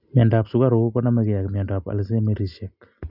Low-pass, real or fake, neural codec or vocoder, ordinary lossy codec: 5.4 kHz; real; none; none